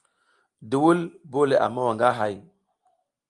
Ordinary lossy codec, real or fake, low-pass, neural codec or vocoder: Opus, 32 kbps; real; 10.8 kHz; none